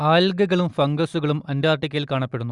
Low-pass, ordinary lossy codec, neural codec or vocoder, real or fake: 10.8 kHz; Opus, 64 kbps; none; real